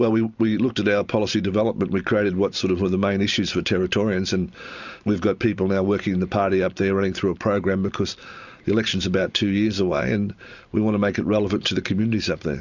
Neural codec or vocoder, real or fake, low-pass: none; real; 7.2 kHz